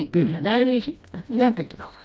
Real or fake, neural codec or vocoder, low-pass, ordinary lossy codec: fake; codec, 16 kHz, 1 kbps, FreqCodec, smaller model; none; none